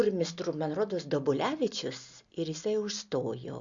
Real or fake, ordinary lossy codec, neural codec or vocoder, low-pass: real; Opus, 64 kbps; none; 7.2 kHz